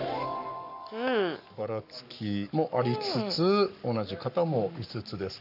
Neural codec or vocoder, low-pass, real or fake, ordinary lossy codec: codec, 44.1 kHz, 7.8 kbps, Pupu-Codec; 5.4 kHz; fake; none